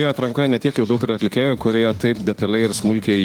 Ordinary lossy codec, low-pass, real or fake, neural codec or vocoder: Opus, 24 kbps; 19.8 kHz; fake; autoencoder, 48 kHz, 32 numbers a frame, DAC-VAE, trained on Japanese speech